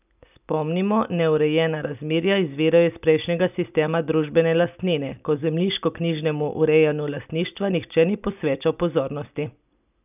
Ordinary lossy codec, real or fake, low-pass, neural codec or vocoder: none; real; 3.6 kHz; none